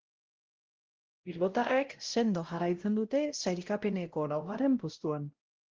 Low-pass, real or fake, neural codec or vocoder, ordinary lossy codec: 7.2 kHz; fake; codec, 16 kHz, 0.5 kbps, X-Codec, WavLM features, trained on Multilingual LibriSpeech; Opus, 16 kbps